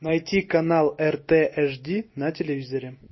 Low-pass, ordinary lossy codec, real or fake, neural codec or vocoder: 7.2 kHz; MP3, 24 kbps; real; none